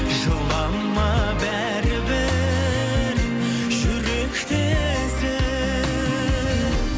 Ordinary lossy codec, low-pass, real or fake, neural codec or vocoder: none; none; real; none